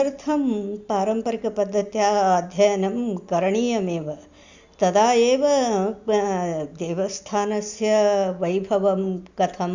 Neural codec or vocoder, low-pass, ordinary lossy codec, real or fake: none; 7.2 kHz; Opus, 64 kbps; real